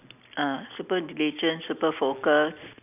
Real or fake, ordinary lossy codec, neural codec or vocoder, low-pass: real; none; none; 3.6 kHz